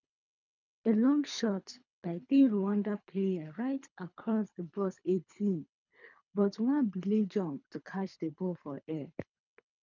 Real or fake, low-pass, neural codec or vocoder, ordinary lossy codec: fake; 7.2 kHz; codec, 24 kHz, 3 kbps, HILCodec; none